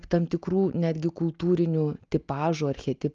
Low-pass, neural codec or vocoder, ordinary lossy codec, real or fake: 7.2 kHz; none; Opus, 32 kbps; real